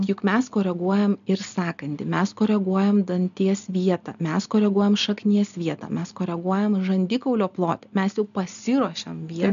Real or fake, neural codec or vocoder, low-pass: real; none; 7.2 kHz